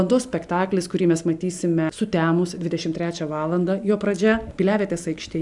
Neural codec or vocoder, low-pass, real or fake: none; 10.8 kHz; real